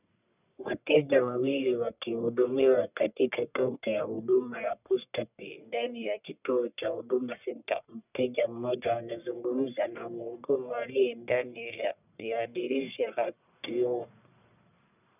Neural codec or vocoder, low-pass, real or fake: codec, 44.1 kHz, 1.7 kbps, Pupu-Codec; 3.6 kHz; fake